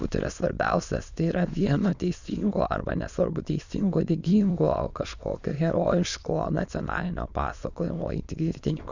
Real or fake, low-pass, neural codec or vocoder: fake; 7.2 kHz; autoencoder, 22.05 kHz, a latent of 192 numbers a frame, VITS, trained on many speakers